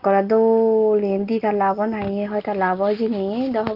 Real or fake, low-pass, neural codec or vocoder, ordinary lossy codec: real; 5.4 kHz; none; Opus, 32 kbps